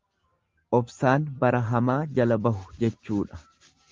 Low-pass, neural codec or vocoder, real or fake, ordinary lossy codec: 7.2 kHz; none; real; Opus, 32 kbps